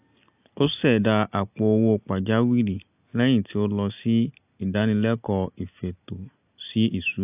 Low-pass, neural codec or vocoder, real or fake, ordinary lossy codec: 3.6 kHz; none; real; AAC, 32 kbps